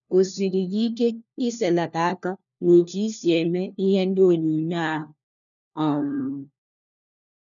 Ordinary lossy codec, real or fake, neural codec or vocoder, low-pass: none; fake; codec, 16 kHz, 1 kbps, FunCodec, trained on LibriTTS, 50 frames a second; 7.2 kHz